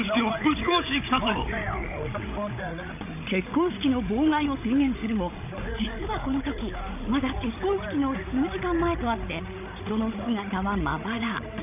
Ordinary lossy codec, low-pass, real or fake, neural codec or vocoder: none; 3.6 kHz; fake; codec, 16 kHz, 16 kbps, FreqCodec, larger model